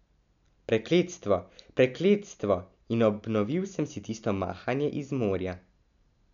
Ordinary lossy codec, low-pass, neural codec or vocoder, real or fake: none; 7.2 kHz; none; real